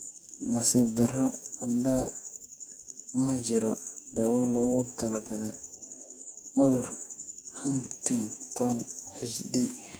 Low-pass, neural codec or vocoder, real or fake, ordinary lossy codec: none; codec, 44.1 kHz, 2.6 kbps, DAC; fake; none